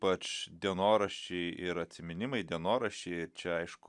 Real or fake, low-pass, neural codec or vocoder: real; 10.8 kHz; none